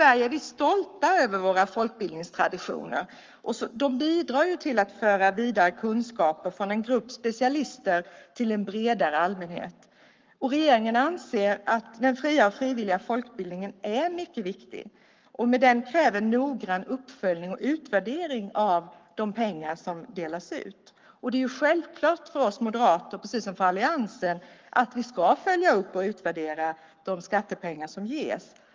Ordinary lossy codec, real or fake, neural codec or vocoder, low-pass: Opus, 32 kbps; fake; codec, 44.1 kHz, 7.8 kbps, Pupu-Codec; 7.2 kHz